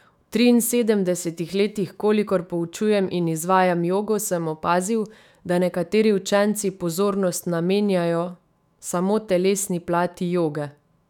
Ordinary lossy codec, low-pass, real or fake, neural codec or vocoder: none; 19.8 kHz; fake; autoencoder, 48 kHz, 128 numbers a frame, DAC-VAE, trained on Japanese speech